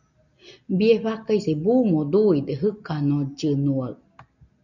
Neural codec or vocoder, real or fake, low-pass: none; real; 7.2 kHz